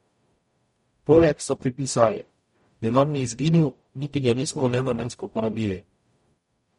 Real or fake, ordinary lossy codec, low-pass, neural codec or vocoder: fake; MP3, 48 kbps; 19.8 kHz; codec, 44.1 kHz, 0.9 kbps, DAC